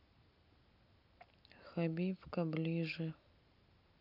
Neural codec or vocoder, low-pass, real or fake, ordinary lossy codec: none; 5.4 kHz; real; none